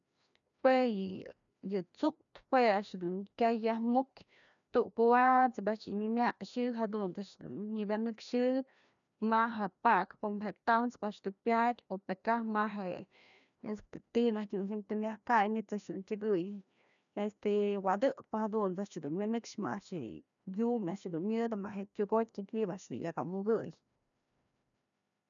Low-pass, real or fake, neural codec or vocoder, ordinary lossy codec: 7.2 kHz; fake; codec, 16 kHz, 1 kbps, FreqCodec, larger model; none